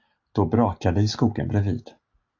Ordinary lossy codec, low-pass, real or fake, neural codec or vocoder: AAC, 48 kbps; 7.2 kHz; real; none